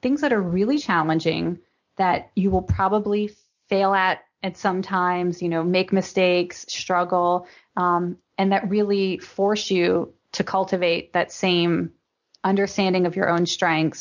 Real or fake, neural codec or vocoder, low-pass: real; none; 7.2 kHz